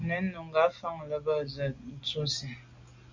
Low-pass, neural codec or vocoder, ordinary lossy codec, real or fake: 7.2 kHz; none; MP3, 48 kbps; real